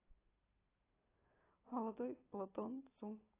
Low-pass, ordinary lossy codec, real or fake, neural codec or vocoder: 3.6 kHz; AAC, 16 kbps; real; none